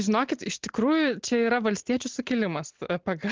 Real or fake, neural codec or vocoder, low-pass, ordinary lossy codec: real; none; 7.2 kHz; Opus, 16 kbps